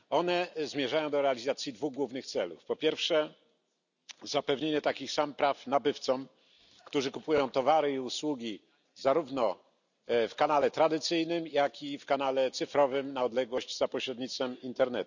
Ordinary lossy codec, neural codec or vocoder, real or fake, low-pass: none; none; real; 7.2 kHz